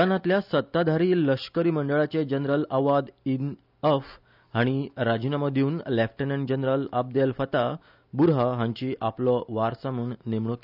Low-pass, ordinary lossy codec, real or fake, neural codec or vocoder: 5.4 kHz; none; real; none